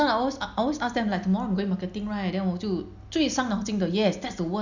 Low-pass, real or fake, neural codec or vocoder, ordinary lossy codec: 7.2 kHz; real; none; none